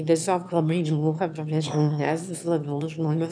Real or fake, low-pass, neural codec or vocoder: fake; 9.9 kHz; autoencoder, 22.05 kHz, a latent of 192 numbers a frame, VITS, trained on one speaker